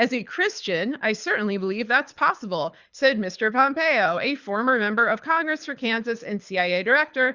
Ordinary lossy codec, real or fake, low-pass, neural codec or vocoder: Opus, 64 kbps; fake; 7.2 kHz; codec, 24 kHz, 6 kbps, HILCodec